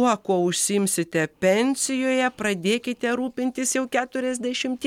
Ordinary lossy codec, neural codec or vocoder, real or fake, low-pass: MP3, 96 kbps; none; real; 19.8 kHz